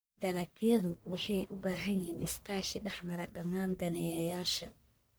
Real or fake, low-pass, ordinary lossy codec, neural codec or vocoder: fake; none; none; codec, 44.1 kHz, 1.7 kbps, Pupu-Codec